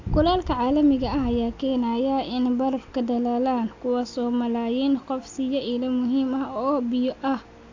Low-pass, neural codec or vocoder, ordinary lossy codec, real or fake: 7.2 kHz; none; AAC, 48 kbps; real